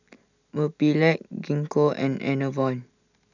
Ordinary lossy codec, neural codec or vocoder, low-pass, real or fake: none; none; 7.2 kHz; real